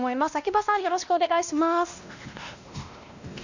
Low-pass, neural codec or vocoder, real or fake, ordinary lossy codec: 7.2 kHz; codec, 16 kHz, 1 kbps, X-Codec, WavLM features, trained on Multilingual LibriSpeech; fake; none